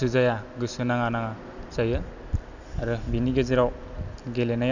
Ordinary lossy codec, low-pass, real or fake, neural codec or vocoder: none; 7.2 kHz; real; none